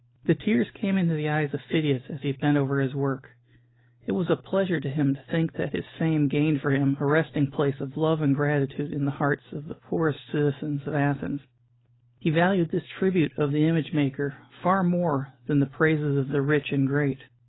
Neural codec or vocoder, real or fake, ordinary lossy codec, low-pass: none; real; AAC, 16 kbps; 7.2 kHz